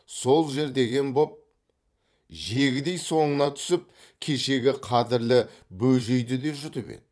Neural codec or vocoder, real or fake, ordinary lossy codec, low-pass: vocoder, 22.05 kHz, 80 mel bands, Vocos; fake; none; none